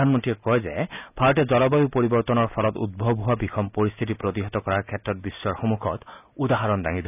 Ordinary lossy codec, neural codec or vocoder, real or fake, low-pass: none; none; real; 3.6 kHz